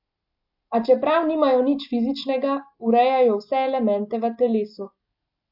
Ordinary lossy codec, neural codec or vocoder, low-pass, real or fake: AAC, 48 kbps; none; 5.4 kHz; real